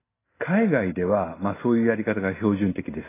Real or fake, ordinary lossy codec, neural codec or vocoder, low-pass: real; AAC, 16 kbps; none; 3.6 kHz